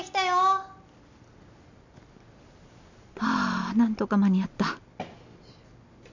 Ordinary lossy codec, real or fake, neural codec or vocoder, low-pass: none; real; none; 7.2 kHz